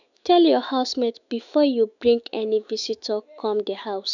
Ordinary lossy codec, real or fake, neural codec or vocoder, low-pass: none; fake; autoencoder, 48 kHz, 128 numbers a frame, DAC-VAE, trained on Japanese speech; 7.2 kHz